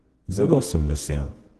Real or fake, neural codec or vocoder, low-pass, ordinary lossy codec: fake; codec, 24 kHz, 0.9 kbps, WavTokenizer, medium music audio release; 10.8 kHz; Opus, 16 kbps